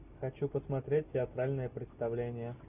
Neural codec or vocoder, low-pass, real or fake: none; 3.6 kHz; real